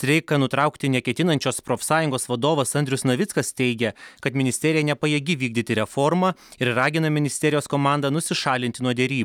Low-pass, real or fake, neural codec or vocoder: 19.8 kHz; real; none